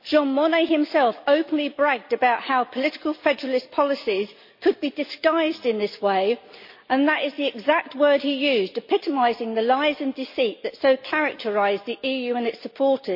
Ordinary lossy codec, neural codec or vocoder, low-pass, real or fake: MP3, 32 kbps; none; 5.4 kHz; real